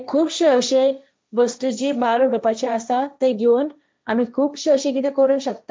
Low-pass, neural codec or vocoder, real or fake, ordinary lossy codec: none; codec, 16 kHz, 1.1 kbps, Voila-Tokenizer; fake; none